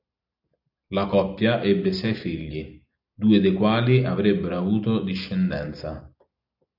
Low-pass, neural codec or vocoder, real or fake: 5.4 kHz; none; real